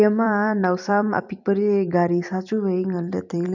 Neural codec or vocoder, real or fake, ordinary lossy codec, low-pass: vocoder, 44.1 kHz, 128 mel bands every 256 samples, BigVGAN v2; fake; none; 7.2 kHz